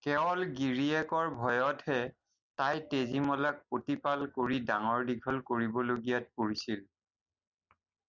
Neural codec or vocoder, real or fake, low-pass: none; real; 7.2 kHz